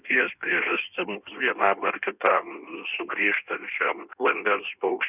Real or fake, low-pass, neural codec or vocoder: fake; 3.6 kHz; codec, 16 kHz in and 24 kHz out, 1.1 kbps, FireRedTTS-2 codec